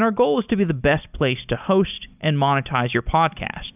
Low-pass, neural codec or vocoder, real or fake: 3.6 kHz; none; real